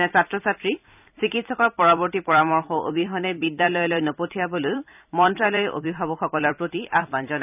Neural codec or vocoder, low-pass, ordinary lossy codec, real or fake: vocoder, 44.1 kHz, 128 mel bands every 256 samples, BigVGAN v2; 3.6 kHz; none; fake